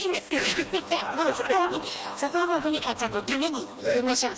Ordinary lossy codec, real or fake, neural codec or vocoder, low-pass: none; fake; codec, 16 kHz, 1 kbps, FreqCodec, smaller model; none